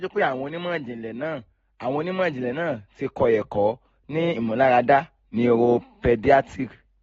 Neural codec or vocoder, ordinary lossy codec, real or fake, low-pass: none; AAC, 24 kbps; real; 9.9 kHz